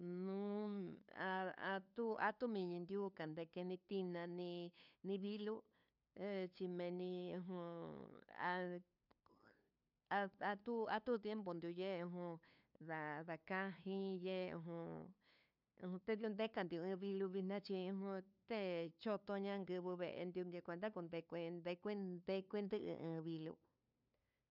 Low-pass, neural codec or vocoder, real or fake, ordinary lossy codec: 5.4 kHz; codec, 16 kHz, 2 kbps, FunCodec, trained on LibriTTS, 25 frames a second; fake; none